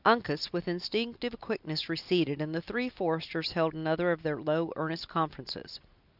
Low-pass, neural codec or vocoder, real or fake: 5.4 kHz; none; real